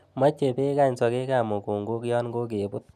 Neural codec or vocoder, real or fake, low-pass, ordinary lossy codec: none; real; 14.4 kHz; none